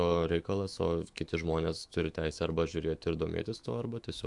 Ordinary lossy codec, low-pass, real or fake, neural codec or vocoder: AAC, 64 kbps; 10.8 kHz; fake; autoencoder, 48 kHz, 128 numbers a frame, DAC-VAE, trained on Japanese speech